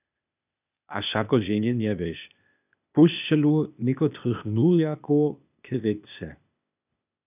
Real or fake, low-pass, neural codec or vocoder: fake; 3.6 kHz; codec, 16 kHz, 0.8 kbps, ZipCodec